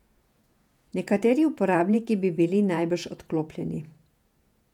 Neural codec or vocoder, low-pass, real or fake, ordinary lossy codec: vocoder, 48 kHz, 128 mel bands, Vocos; 19.8 kHz; fake; none